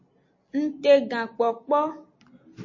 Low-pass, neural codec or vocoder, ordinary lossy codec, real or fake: 7.2 kHz; none; MP3, 32 kbps; real